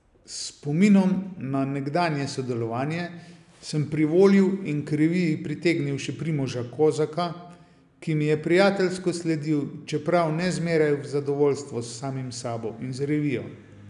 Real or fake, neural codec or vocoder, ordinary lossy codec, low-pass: real; none; none; 10.8 kHz